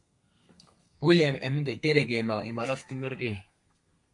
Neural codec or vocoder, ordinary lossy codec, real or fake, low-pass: codec, 32 kHz, 1.9 kbps, SNAC; MP3, 64 kbps; fake; 10.8 kHz